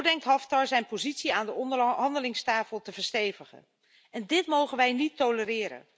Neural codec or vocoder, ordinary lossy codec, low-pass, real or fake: none; none; none; real